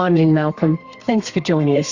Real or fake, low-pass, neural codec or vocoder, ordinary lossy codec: fake; 7.2 kHz; codec, 32 kHz, 1.9 kbps, SNAC; Opus, 64 kbps